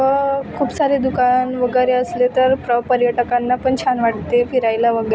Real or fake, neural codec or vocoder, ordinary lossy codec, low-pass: real; none; none; none